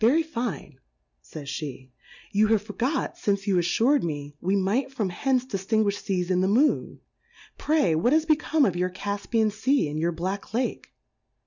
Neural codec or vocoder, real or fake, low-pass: none; real; 7.2 kHz